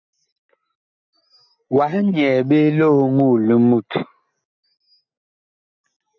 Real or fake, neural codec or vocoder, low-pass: real; none; 7.2 kHz